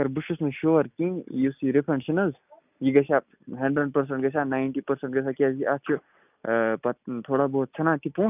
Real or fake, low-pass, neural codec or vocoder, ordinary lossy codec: real; 3.6 kHz; none; none